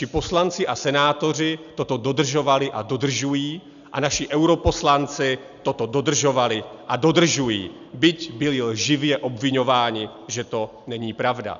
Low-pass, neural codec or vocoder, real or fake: 7.2 kHz; none; real